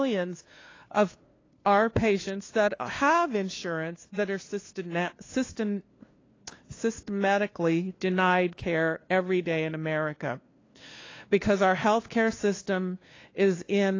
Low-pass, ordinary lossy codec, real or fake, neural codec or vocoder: 7.2 kHz; AAC, 32 kbps; fake; codec, 16 kHz, 2 kbps, FunCodec, trained on LibriTTS, 25 frames a second